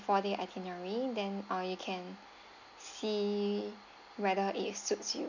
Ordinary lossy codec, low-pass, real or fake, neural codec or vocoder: none; 7.2 kHz; real; none